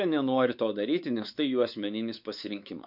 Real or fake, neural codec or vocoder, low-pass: fake; codec, 16 kHz, 4 kbps, X-Codec, WavLM features, trained on Multilingual LibriSpeech; 5.4 kHz